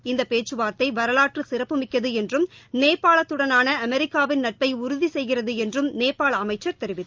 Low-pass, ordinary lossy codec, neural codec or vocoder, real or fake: 7.2 kHz; Opus, 24 kbps; none; real